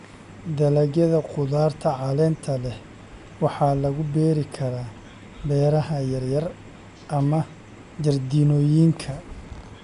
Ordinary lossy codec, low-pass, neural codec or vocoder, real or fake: none; 10.8 kHz; none; real